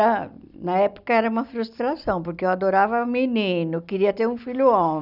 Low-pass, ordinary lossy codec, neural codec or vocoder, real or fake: 5.4 kHz; none; none; real